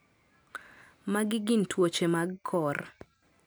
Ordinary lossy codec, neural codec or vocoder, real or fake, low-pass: none; none; real; none